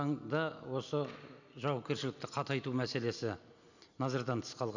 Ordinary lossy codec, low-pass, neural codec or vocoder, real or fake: none; 7.2 kHz; none; real